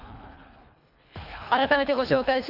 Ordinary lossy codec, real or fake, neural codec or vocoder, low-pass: none; fake; codec, 24 kHz, 3 kbps, HILCodec; 5.4 kHz